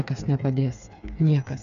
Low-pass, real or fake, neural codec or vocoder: 7.2 kHz; fake; codec, 16 kHz, 4 kbps, FreqCodec, smaller model